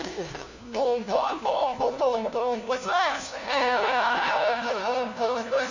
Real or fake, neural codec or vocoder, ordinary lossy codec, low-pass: fake; codec, 16 kHz, 1 kbps, FunCodec, trained on LibriTTS, 50 frames a second; none; 7.2 kHz